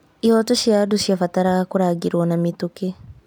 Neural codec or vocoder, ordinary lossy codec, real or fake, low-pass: none; none; real; none